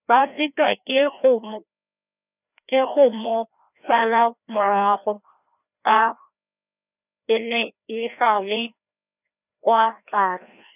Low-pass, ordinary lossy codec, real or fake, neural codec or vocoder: 3.6 kHz; none; fake; codec, 16 kHz, 1 kbps, FreqCodec, larger model